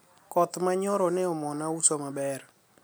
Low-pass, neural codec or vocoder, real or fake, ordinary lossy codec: none; none; real; none